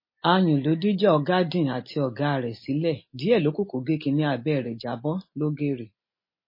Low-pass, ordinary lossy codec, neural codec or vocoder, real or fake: 5.4 kHz; MP3, 24 kbps; none; real